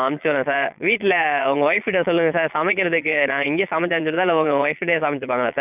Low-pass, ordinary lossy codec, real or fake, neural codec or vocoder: 3.6 kHz; none; fake; vocoder, 22.05 kHz, 80 mel bands, Vocos